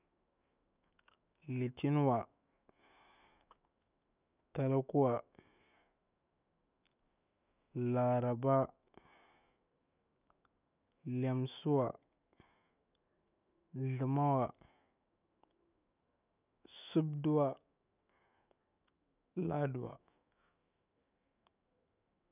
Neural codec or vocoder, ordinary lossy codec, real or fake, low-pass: autoencoder, 48 kHz, 128 numbers a frame, DAC-VAE, trained on Japanese speech; none; fake; 3.6 kHz